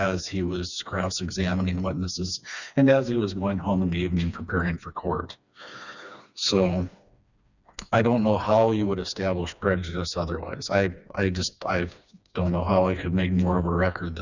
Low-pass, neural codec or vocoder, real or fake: 7.2 kHz; codec, 16 kHz, 2 kbps, FreqCodec, smaller model; fake